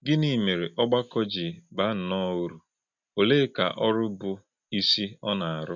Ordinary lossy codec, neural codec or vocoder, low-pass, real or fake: none; none; 7.2 kHz; real